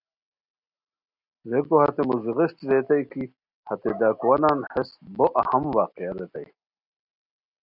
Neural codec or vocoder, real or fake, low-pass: none; real; 5.4 kHz